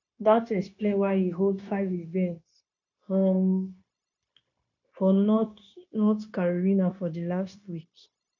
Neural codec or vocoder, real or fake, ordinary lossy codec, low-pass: codec, 16 kHz, 0.9 kbps, LongCat-Audio-Codec; fake; none; 7.2 kHz